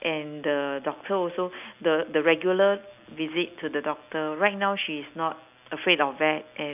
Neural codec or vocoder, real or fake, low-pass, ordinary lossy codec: none; real; 3.6 kHz; none